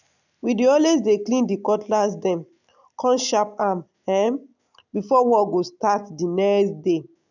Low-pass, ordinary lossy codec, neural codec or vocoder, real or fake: 7.2 kHz; none; none; real